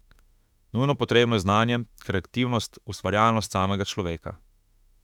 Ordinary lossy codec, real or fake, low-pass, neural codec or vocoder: none; fake; 19.8 kHz; autoencoder, 48 kHz, 32 numbers a frame, DAC-VAE, trained on Japanese speech